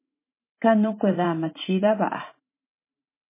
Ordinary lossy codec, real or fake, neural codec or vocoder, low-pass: MP3, 16 kbps; fake; vocoder, 44.1 kHz, 128 mel bands every 512 samples, BigVGAN v2; 3.6 kHz